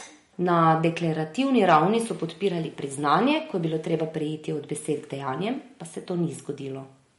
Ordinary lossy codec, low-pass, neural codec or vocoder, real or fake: MP3, 48 kbps; 19.8 kHz; none; real